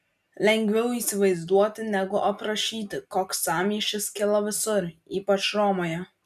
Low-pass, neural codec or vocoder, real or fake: 14.4 kHz; none; real